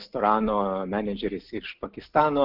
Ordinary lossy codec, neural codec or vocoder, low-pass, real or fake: Opus, 32 kbps; none; 5.4 kHz; real